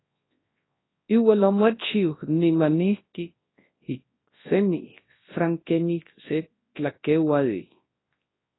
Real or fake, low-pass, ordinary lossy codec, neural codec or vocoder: fake; 7.2 kHz; AAC, 16 kbps; codec, 24 kHz, 0.9 kbps, WavTokenizer, large speech release